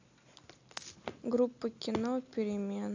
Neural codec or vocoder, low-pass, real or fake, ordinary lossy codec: none; 7.2 kHz; real; AAC, 48 kbps